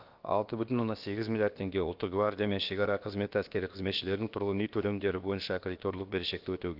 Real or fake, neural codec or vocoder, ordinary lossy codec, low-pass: fake; codec, 16 kHz, about 1 kbps, DyCAST, with the encoder's durations; Opus, 32 kbps; 5.4 kHz